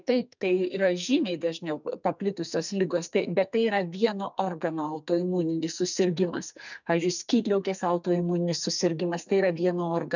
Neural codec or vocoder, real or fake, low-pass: codec, 44.1 kHz, 2.6 kbps, SNAC; fake; 7.2 kHz